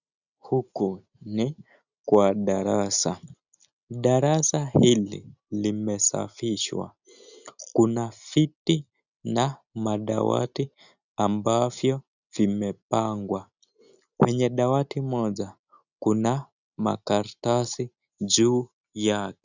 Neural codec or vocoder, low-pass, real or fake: none; 7.2 kHz; real